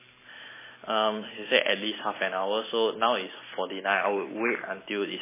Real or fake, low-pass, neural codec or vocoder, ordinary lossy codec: real; 3.6 kHz; none; MP3, 16 kbps